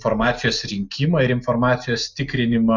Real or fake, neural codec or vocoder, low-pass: real; none; 7.2 kHz